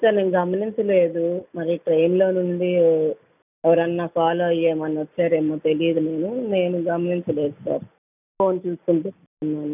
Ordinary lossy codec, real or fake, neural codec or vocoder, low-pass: none; real; none; 3.6 kHz